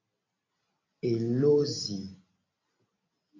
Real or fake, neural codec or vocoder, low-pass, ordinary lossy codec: real; none; 7.2 kHz; AAC, 32 kbps